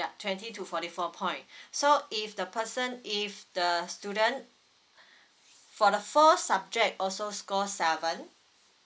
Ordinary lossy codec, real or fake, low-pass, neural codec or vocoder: none; real; none; none